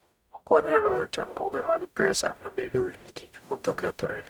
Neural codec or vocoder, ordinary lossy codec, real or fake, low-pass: codec, 44.1 kHz, 0.9 kbps, DAC; none; fake; none